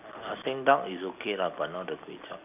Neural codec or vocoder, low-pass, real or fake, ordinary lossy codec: none; 3.6 kHz; real; none